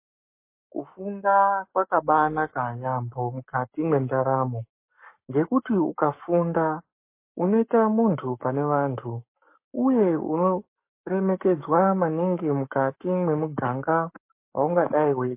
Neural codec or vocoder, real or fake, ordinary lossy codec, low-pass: codec, 44.1 kHz, 7.8 kbps, Pupu-Codec; fake; MP3, 24 kbps; 3.6 kHz